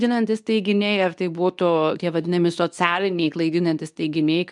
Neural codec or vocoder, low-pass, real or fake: codec, 24 kHz, 0.9 kbps, WavTokenizer, medium speech release version 2; 10.8 kHz; fake